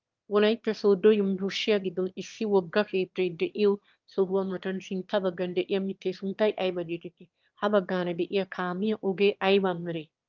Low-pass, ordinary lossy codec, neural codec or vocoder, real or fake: 7.2 kHz; Opus, 32 kbps; autoencoder, 22.05 kHz, a latent of 192 numbers a frame, VITS, trained on one speaker; fake